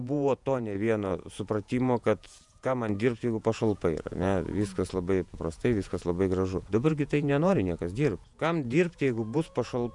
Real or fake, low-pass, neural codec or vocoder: fake; 10.8 kHz; vocoder, 48 kHz, 128 mel bands, Vocos